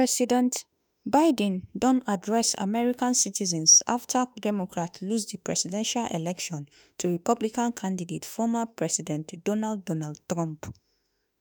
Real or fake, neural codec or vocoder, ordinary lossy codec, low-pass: fake; autoencoder, 48 kHz, 32 numbers a frame, DAC-VAE, trained on Japanese speech; none; none